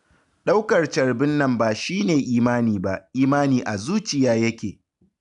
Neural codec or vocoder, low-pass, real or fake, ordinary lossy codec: none; 10.8 kHz; real; none